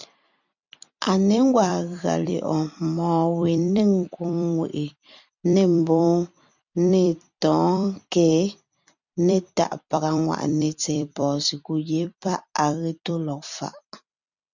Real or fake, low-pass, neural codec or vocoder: fake; 7.2 kHz; vocoder, 44.1 kHz, 128 mel bands every 256 samples, BigVGAN v2